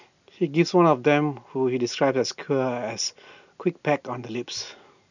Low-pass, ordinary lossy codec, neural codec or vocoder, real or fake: 7.2 kHz; none; none; real